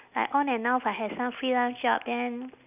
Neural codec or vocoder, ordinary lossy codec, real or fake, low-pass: none; none; real; 3.6 kHz